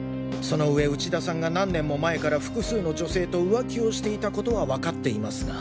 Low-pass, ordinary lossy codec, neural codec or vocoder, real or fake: none; none; none; real